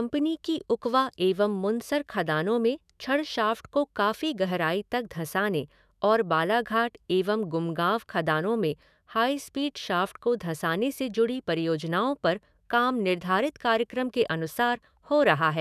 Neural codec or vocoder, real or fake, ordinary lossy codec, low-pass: autoencoder, 48 kHz, 128 numbers a frame, DAC-VAE, trained on Japanese speech; fake; none; 14.4 kHz